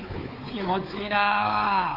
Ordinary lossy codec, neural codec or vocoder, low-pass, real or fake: Opus, 24 kbps; codec, 16 kHz, 4 kbps, X-Codec, WavLM features, trained on Multilingual LibriSpeech; 5.4 kHz; fake